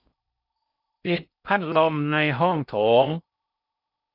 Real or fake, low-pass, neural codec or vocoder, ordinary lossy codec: fake; 5.4 kHz; codec, 16 kHz in and 24 kHz out, 0.6 kbps, FocalCodec, streaming, 4096 codes; none